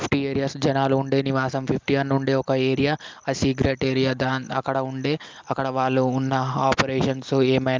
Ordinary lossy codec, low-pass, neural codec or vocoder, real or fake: Opus, 24 kbps; 7.2 kHz; none; real